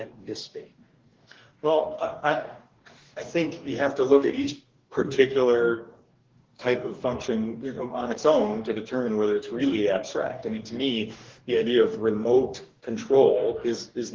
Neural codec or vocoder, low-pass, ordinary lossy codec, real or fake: codec, 44.1 kHz, 2.6 kbps, DAC; 7.2 kHz; Opus, 16 kbps; fake